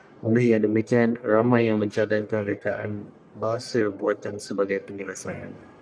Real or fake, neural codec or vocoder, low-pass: fake; codec, 44.1 kHz, 1.7 kbps, Pupu-Codec; 9.9 kHz